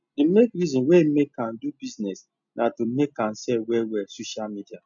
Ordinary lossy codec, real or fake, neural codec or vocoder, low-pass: none; real; none; 7.2 kHz